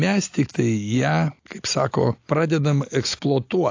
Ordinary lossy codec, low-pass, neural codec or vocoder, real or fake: AAC, 48 kbps; 7.2 kHz; none; real